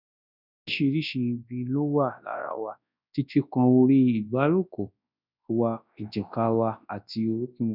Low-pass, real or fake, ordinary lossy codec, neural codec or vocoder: 5.4 kHz; fake; none; codec, 24 kHz, 0.9 kbps, WavTokenizer, large speech release